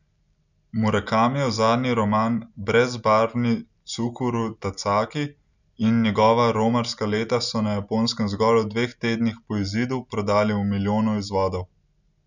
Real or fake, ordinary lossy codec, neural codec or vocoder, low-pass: real; none; none; 7.2 kHz